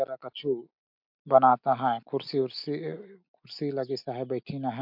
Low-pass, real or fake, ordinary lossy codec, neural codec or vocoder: 5.4 kHz; real; MP3, 48 kbps; none